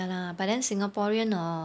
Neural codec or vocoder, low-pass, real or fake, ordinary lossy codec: none; none; real; none